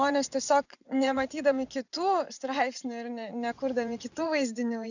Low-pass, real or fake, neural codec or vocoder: 7.2 kHz; fake; vocoder, 24 kHz, 100 mel bands, Vocos